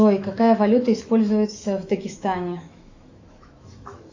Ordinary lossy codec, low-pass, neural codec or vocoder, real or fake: AAC, 48 kbps; 7.2 kHz; autoencoder, 48 kHz, 128 numbers a frame, DAC-VAE, trained on Japanese speech; fake